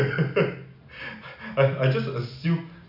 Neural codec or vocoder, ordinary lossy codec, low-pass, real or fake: none; none; 5.4 kHz; real